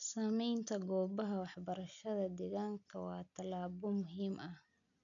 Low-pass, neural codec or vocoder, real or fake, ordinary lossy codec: 7.2 kHz; none; real; none